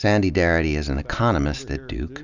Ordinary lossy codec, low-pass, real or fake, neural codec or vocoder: Opus, 64 kbps; 7.2 kHz; real; none